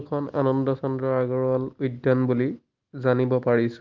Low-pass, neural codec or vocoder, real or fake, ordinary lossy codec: 7.2 kHz; none; real; Opus, 32 kbps